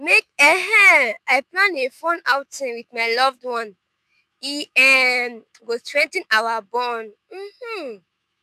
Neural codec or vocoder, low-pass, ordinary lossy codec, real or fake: autoencoder, 48 kHz, 128 numbers a frame, DAC-VAE, trained on Japanese speech; 14.4 kHz; none; fake